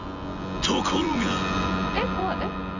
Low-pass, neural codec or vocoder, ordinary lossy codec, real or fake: 7.2 kHz; vocoder, 24 kHz, 100 mel bands, Vocos; none; fake